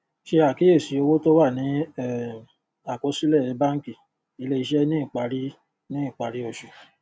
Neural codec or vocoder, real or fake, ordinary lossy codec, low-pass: none; real; none; none